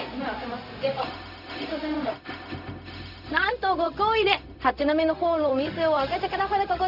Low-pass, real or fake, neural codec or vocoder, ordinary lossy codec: 5.4 kHz; fake; codec, 16 kHz, 0.4 kbps, LongCat-Audio-Codec; none